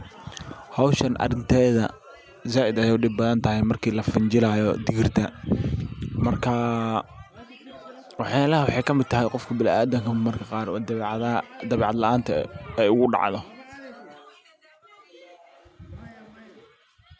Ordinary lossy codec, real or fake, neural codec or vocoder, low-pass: none; real; none; none